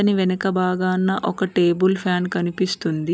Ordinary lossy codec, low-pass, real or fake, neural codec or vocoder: none; none; real; none